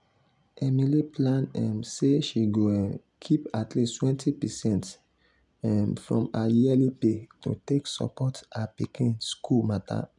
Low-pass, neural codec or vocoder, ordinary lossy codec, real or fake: 10.8 kHz; none; none; real